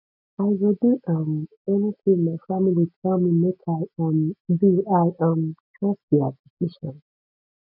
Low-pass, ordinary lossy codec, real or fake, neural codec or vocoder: 5.4 kHz; none; real; none